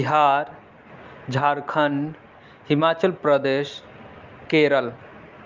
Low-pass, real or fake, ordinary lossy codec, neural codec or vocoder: 7.2 kHz; real; Opus, 24 kbps; none